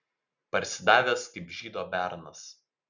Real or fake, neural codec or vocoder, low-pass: real; none; 7.2 kHz